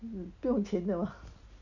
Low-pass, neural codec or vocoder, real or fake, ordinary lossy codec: 7.2 kHz; none; real; none